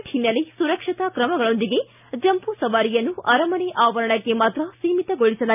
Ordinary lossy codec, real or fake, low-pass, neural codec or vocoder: none; real; 3.6 kHz; none